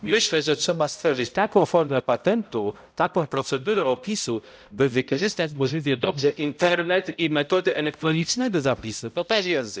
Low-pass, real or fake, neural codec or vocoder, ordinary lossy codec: none; fake; codec, 16 kHz, 0.5 kbps, X-Codec, HuBERT features, trained on balanced general audio; none